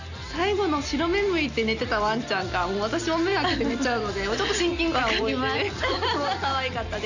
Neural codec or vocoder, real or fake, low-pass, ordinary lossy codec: none; real; 7.2 kHz; none